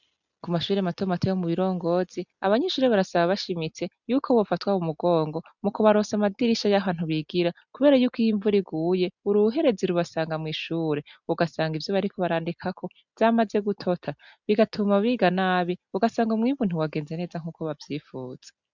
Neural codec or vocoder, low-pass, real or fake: none; 7.2 kHz; real